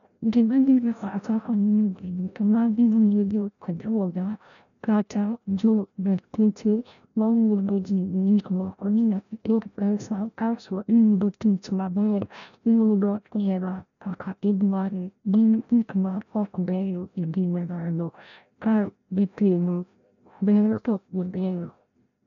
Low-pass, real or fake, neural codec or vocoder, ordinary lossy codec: 7.2 kHz; fake; codec, 16 kHz, 0.5 kbps, FreqCodec, larger model; none